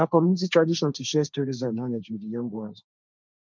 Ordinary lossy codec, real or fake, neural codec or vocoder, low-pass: none; fake; codec, 16 kHz, 1.1 kbps, Voila-Tokenizer; none